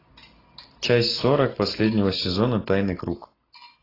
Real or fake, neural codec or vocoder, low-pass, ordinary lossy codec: real; none; 5.4 kHz; AAC, 24 kbps